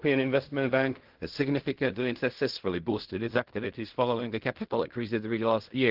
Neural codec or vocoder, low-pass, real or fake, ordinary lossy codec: codec, 16 kHz in and 24 kHz out, 0.4 kbps, LongCat-Audio-Codec, fine tuned four codebook decoder; 5.4 kHz; fake; Opus, 16 kbps